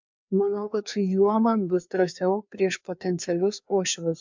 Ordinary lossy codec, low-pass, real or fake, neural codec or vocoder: MP3, 64 kbps; 7.2 kHz; fake; codec, 16 kHz, 2 kbps, FreqCodec, larger model